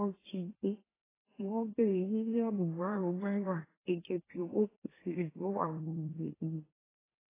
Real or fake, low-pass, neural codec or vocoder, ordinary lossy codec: fake; 3.6 kHz; autoencoder, 44.1 kHz, a latent of 192 numbers a frame, MeloTTS; AAC, 16 kbps